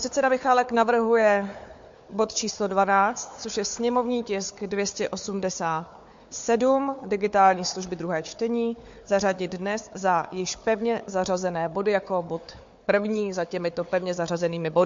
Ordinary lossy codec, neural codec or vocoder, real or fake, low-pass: MP3, 48 kbps; codec, 16 kHz, 4 kbps, FunCodec, trained on Chinese and English, 50 frames a second; fake; 7.2 kHz